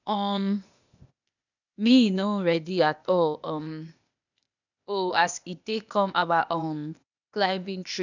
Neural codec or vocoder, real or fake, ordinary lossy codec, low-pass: codec, 16 kHz, 0.8 kbps, ZipCodec; fake; none; 7.2 kHz